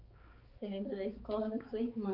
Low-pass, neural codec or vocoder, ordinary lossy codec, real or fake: 5.4 kHz; codec, 16 kHz, 4 kbps, X-Codec, HuBERT features, trained on balanced general audio; Opus, 32 kbps; fake